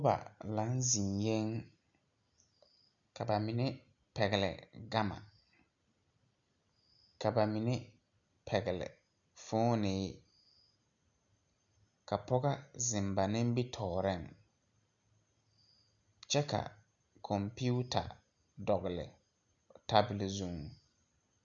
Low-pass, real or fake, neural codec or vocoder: 7.2 kHz; real; none